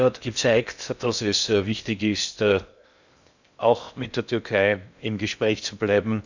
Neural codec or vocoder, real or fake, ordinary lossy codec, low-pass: codec, 16 kHz in and 24 kHz out, 0.6 kbps, FocalCodec, streaming, 2048 codes; fake; none; 7.2 kHz